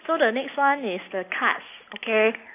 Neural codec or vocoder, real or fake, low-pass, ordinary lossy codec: none; real; 3.6 kHz; AAC, 24 kbps